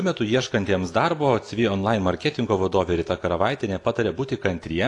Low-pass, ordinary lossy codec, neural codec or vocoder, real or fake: 10.8 kHz; AAC, 48 kbps; none; real